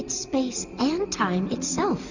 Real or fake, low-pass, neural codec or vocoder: real; 7.2 kHz; none